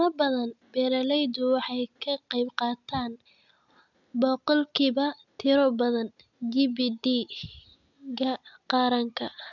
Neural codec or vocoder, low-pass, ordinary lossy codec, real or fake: none; 7.2 kHz; none; real